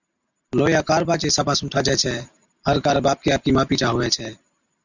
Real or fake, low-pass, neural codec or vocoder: real; 7.2 kHz; none